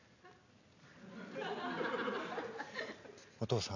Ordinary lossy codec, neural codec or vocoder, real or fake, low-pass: none; none; real; 7.2 kHz